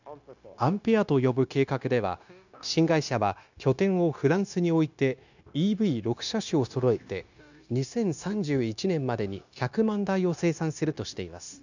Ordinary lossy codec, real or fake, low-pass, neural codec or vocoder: none; fake; 7.2 kHz; codec, 16 kHz, 0.9 kbps, LongCat-Audio-Codec